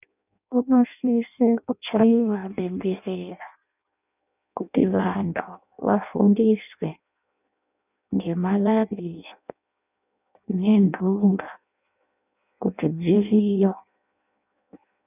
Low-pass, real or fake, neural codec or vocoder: 3.6 kHz; fake; codec, 16 kHz in and 24 kHz out, 0.6 kbps, FireRedTTS-2 codec